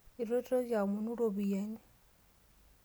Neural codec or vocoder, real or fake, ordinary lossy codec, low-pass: none; real; none; none